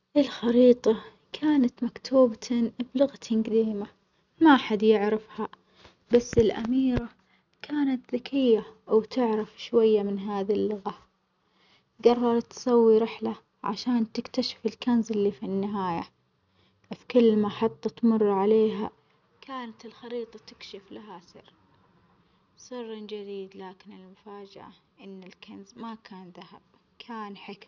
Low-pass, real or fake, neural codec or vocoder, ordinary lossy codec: 7.2 kHz; real; none; none